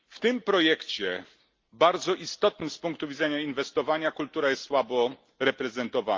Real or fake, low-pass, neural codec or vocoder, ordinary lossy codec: real; 7.2 kHz; none; Opus, 32 kbps